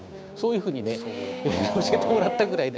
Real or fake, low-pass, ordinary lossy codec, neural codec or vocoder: fake; none; none; codec, 16 kHz, 6 kbps, DAC